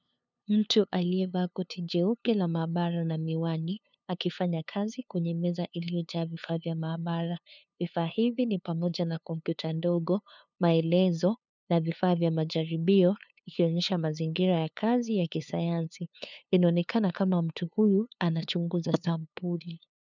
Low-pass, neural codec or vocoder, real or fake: 7.2 kHz; codec, 16 kHz, 2 kbps, FunCodec, trained on LibriTTS, 25 frames a second; fake